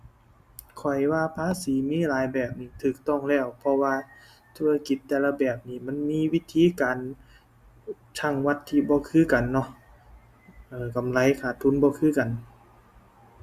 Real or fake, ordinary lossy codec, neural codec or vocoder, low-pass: real; Opus, 64 kbps; none; 14.4 kHz